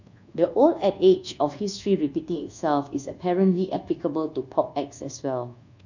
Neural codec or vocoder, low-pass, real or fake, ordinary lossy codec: codec, 24 kHz, 1.2 kbps, DualCodec; 7.2 kHz; fake; none